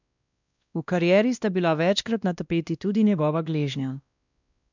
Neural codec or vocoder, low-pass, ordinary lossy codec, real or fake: codec, 16 kHz, 1 kbps, X-Codec, WavLM features, trained on Multilingual LibriSpeech; 7.2 kHz; none; fake